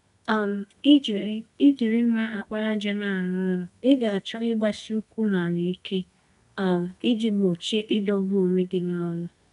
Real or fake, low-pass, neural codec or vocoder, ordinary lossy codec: fake; 10.8 kHz; codec, 24 kHz, 0.9 kbps, WavTokenizer, medium music audio release; none